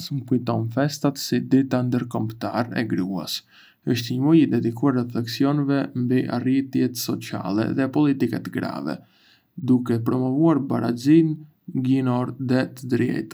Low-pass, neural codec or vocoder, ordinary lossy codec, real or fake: none; none; none; real